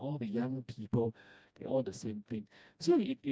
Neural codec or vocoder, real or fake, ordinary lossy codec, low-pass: codec, 16 kHz, 1 kbps, FreqCodec, smaller model; fake; none; none